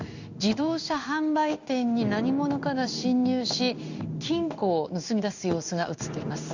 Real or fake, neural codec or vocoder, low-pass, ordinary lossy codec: fake; codec, 16 kHz in and 24 kHz out, 1 kbps, XY-Tokenizer; 7.2 kHz; none